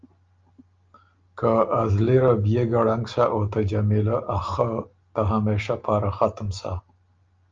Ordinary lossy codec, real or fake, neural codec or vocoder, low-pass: Opus, 24 kbps; real; none; 7.2 kHz